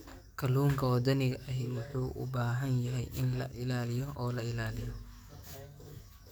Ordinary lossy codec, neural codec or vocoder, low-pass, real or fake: none; codec, 44.1 kHz, 7.8 kbps, DAC; none; fake